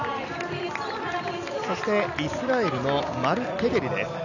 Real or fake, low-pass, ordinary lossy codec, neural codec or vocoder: real; 7.2 kHz; none; none